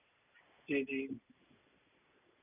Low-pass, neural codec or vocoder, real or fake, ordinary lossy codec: 3.6 kHz; vocoder, 44.1 kHz, 128 mel bands every 256 samples, BigVGAN v2; fake; none